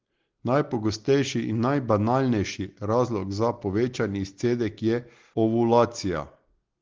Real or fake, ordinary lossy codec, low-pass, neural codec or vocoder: real; Opus, 16 kbps; 7.2 kHz; none